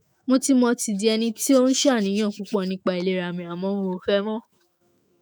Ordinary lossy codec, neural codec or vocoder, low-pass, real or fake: none; autoencoder, 48 kHz, 128 numbers a frame, DAC-VAE, trained on Japanese speech; none; fake